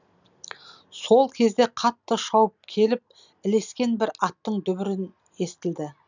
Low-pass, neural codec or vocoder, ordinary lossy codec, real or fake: 7.2 kHz; none; none; real